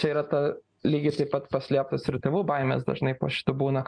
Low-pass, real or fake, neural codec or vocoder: 9.9 kHz; real; none